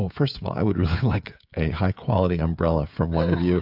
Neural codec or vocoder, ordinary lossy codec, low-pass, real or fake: codec, 16 kHz, 16 kbps, FreqCodec, smaller model; AAC, 48 kbps; 5.4 kHz; fake